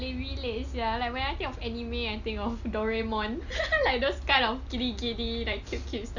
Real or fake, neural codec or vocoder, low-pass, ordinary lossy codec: real; none; 7.2 kHz; none